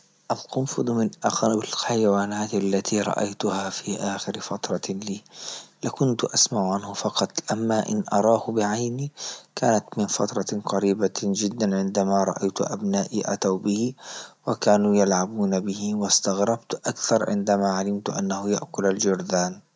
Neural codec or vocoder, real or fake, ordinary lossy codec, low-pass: none; real; none; none